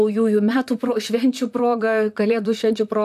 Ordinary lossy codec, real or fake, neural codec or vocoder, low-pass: AAC, 96 kbps; fake; vocoder, 44.1 kHz, 128 mel bands, Pupu-Vocoder; 14.4 kHz